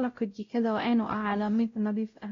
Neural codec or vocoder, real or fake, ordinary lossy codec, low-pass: codec, 16 kHz, 0.5 kbps, X-Codec, WavLM features, trained on Multilingual LibriSpeech; fake; AAC, 32 kbps; 7.2 kHz